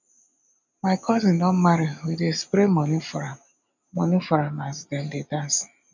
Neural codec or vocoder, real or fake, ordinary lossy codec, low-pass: none; real; none; 7.2 kHz